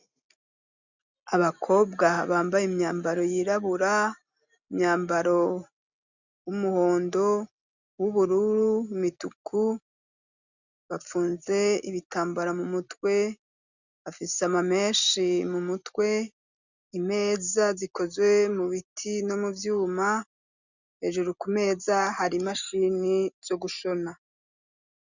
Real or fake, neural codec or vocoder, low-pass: real; none; 7.2 kHz